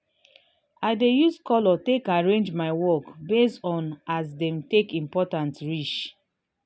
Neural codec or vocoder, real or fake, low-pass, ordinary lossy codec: none; real; none; none